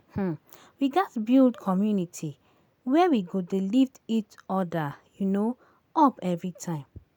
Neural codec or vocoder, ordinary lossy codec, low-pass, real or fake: none; none; none; real